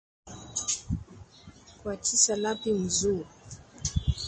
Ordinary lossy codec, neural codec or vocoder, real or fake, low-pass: MP3, 32 kbps; none; real; 9.9 kHz